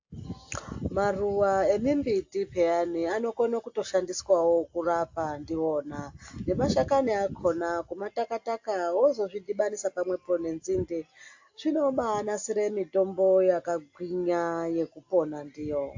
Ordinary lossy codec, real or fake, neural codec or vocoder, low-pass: AAC, 48 kbps; real; none; 7.2 kHz